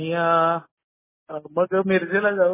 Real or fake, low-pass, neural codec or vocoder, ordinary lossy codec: real; 3.6 kHz; none; MP3, 16 kbps